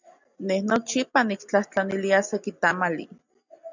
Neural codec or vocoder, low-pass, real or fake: none; 7.2 kHz; real